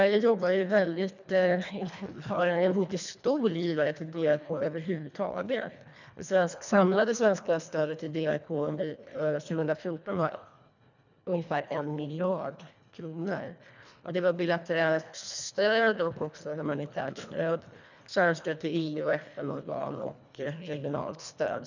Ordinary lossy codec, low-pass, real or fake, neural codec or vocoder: none; 7.2 kHz; fake; codec, 24 kHz, 1.5 kbps, HILCodec